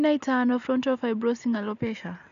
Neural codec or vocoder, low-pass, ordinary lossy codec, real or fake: none; 7.2 kHz; none; real